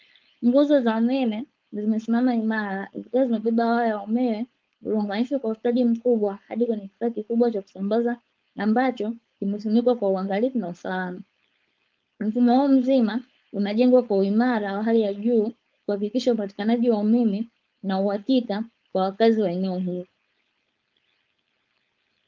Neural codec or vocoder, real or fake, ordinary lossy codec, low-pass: codec, 16 kHz, 4.8 kbps, FACodec; fake; Opus, 24 kbps; 7.2 kHz